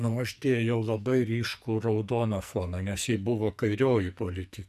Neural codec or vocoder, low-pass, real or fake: codec, 44.1 kHz, 2.6 kbps, SNAC; 14.4 kHz; fake